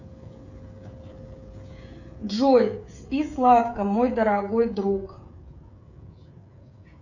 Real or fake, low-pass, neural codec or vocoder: fake; 7.2 kHz; codec, 16 kHz, 16 kbps, FreqCodec, smaller model